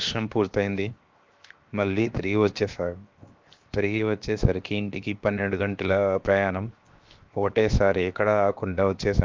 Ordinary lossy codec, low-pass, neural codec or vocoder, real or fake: Opus, 32 kbps; 7.2 kHz; codec, 16 kHz, 0.7 kbps, FocalCodec; fake